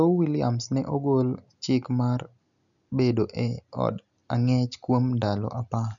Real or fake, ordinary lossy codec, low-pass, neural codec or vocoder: real; none; 7.2 kHz; none